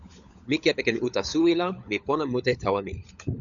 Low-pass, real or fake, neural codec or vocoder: 7.2 kHz; fake; codec, 16 kHz, 16 kbps, FunCodec, trained on LibriTTS, 50 frames a second